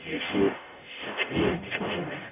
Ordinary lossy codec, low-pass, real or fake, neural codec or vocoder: none; 3.6 kHz; fake; codec, 44.1 kHz, 0.9 kbps, DAC